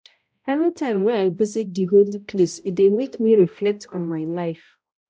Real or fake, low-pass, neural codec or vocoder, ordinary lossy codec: fake; none; codec, 16 kHz, 0.5 kbps, X-Codec, HuBERT features, trained on balanced general audio; none